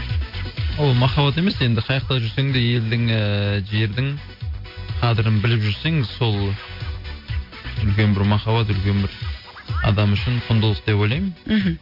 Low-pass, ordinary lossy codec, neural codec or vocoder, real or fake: 5.4 kHz; MP3, 32 kbps; none; real